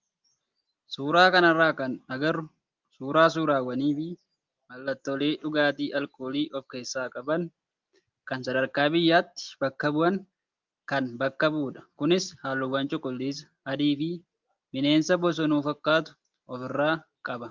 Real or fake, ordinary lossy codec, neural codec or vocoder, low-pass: real; Opus, 32 kbps; none; 7.2 kHz